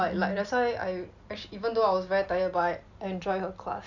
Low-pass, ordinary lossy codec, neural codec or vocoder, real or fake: 7.2 kHz; none; none; real